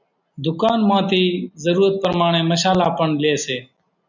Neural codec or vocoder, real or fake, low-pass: none; real; 7.2 kHz